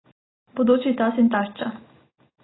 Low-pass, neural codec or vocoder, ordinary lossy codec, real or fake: 7.2 kHz; none; AAC, 16 kbps; real